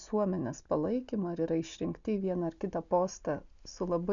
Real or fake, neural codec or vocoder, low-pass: real; none; 7.2 kHz